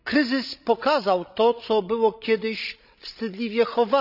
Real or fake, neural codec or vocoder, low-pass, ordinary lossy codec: fake; codec, 16 kHz, 16 kbps, FreqCodec, larger model; 5.4 kHz; none